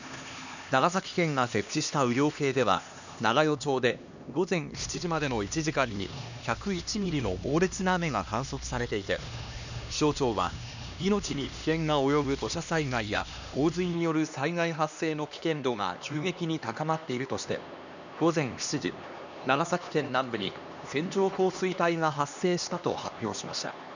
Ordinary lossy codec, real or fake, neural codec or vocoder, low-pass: none; fake; codec, 16 kHz, 2 kbps, X-Codec, HuBERT features, trained on LibriSpeech; 7.2 kHz